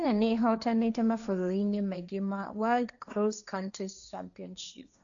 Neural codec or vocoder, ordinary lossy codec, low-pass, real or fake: codec, 16 kHz, 1.1 kbps, Voila-Tokenizer; none; 7.2 kHz; fake